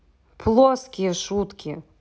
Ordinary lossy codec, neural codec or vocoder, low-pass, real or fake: none; none; none; real